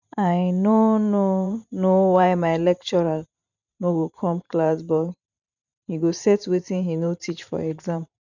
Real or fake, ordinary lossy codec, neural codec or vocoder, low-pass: fake; none; vocoder, 44.1 kHz, 128 mel bands every 512 samples, BigVGAN v2; 7.2 kHz